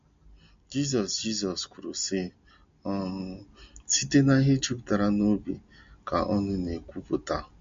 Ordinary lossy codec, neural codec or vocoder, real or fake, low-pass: MP3, 48 kbps; none; real; 7.2 kHz